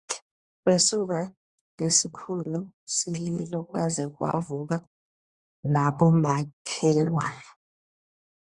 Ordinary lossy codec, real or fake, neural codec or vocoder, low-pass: Opus, 64 kbps; fake; codec, 24 kHz, 1 kbps, SNAC; 10.8 kHz